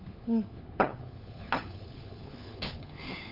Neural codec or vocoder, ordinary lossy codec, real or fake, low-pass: codec, 16 kHz, 4 kbps, FunCodec, trained on LibriTTS, 50 frames a second; none; fake; 5.4 kHz